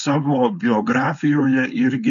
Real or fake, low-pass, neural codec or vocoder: fake; 7.2 kHz; codec, 16 kHz, 4.8 kbps, FACodec